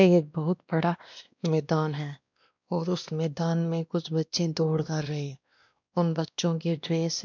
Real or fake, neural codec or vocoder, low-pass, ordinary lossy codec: fake; codec, 16 kHz, 1 kbps, X-Codec, WavLM features, trained on Multilingual LibriSpeech; 7.2 kHz; none